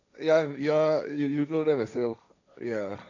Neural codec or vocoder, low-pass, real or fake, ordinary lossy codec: codec, 16 kHz, 1.1 kbps, Voila-Tokenizer; 7.2 kHz; fake; none